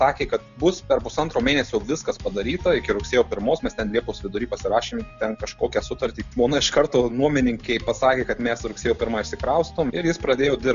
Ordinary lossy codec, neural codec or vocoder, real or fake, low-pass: MP3, 96 kbps; none; real; 7.2 kHz